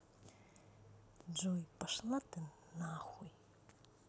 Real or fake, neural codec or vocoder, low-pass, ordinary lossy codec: real; none; none; none